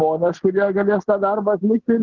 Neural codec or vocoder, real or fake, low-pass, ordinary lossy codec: none; real; 7.2 kHz; Opus, 16 kbps